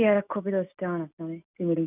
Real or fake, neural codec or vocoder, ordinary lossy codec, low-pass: real; none; none; 3.6 kHz